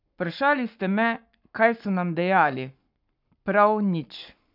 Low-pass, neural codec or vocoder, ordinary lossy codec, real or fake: 5.4 kHz; codec, 44.1 kHz, 7.8 kbps, Pupu-Codec; none; fake